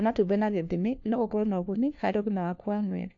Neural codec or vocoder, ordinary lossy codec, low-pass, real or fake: codec, 16 kHz, 1 kbps, FunCodec, trained on LibriTTS, 50 frames a second; AAC, 48 kbps; 7.2 kHz; fake